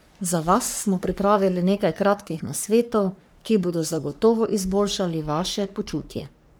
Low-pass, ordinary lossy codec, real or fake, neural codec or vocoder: none; none; fake; codec, 44.1 kHz, 3.4 kbps, Pupu-Codec